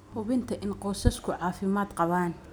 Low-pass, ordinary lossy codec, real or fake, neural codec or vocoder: none; none; real; none